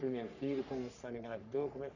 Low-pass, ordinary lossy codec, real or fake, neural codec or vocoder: 7.2 kHz; none; fake; codec, 44.1 kHz, 3.4 kbps, Pupu-Codec